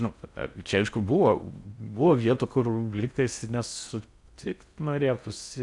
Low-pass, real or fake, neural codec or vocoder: 10.8 kHz; fake; codec, 16 kHz in and 24 kHz out, 0.6 kbps, FocalCodec, streaming, 4096 codes